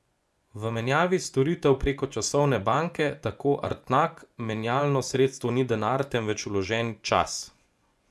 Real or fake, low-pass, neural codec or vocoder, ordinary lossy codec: fake; none; vocoder, 24 kHz, 100 mel bands, Vocos; none